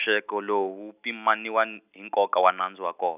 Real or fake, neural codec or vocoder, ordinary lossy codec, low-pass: real; none; none; 3.6 kHz